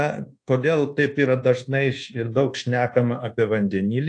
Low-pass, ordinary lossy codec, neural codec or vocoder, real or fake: 9.9 kHz; AAC, 48 kbps; codec, 24 kHz, 1.2 kbps, DualCodec; fake